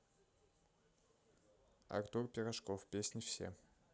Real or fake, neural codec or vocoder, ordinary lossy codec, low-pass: real; none; none; none